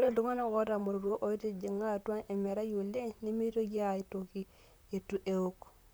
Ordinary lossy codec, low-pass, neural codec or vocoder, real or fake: none; none; vocoder, 44.1 kHz, 128 mel bands, Pupu-Vocoder; fake